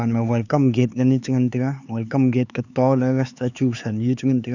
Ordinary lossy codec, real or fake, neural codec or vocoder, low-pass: none; fake; codec, 16 kHz, 4 kbps, X-Codec, HuBERT features, trained on LibriSpeech; 7.2 kHz